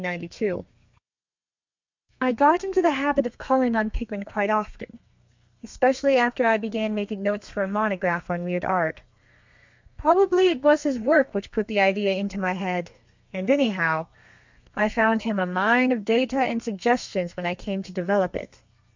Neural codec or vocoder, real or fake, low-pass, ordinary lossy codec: codec, 32 kHz, 1.9 kbps, SNAC; fake; 7.2 kHz; MP3, 64 kbps